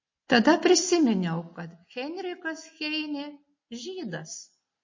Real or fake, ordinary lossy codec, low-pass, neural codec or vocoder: real; MP3, 32 kbps; 7.2 kHz; none